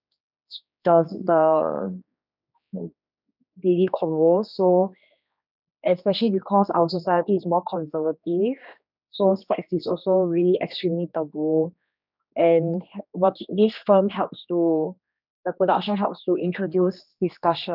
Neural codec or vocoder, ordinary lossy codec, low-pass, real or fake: codec, 16 kHz, 2 kbps, X-Codec, HuBERT features, trained on general audio; none; 5.4 kHz; fake